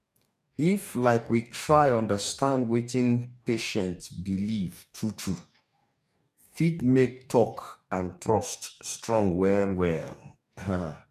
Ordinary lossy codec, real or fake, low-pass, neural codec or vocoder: none; fake; 14.4 kHz; codec, 44.1 kHz, 2.6 kbps, DAC